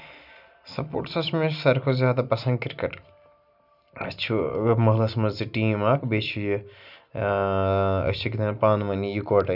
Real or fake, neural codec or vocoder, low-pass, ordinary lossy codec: real; none; 5.4 kHz; none